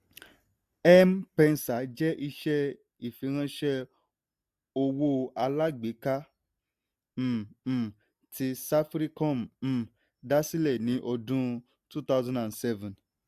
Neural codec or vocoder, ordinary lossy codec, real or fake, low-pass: none; AAC, 96 kbps; real; 14.4 kHz